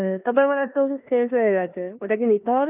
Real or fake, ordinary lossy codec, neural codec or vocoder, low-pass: fake; none; codec, 16 kHz in and 24 kHz out, 0.9 kbps, LongCat-Audio-Codec, four codebook decoder; 3.6 kHz